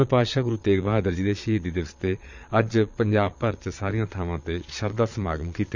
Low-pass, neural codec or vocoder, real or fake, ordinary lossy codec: 7.2 kHz; vocoder, 22.05 kHz, 80 mel bands, Vocos; fake; none